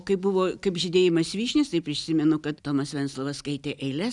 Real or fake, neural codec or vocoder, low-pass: real; none; 10.8 kHz